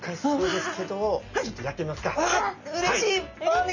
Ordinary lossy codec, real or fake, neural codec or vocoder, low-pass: none; real; none; 7.2 kHz